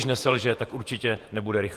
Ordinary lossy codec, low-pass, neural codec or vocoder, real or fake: Opus, 24 kbps; 14.4 kHz; vocoder, 48 kHz, 128 mel bands, Vocos; fake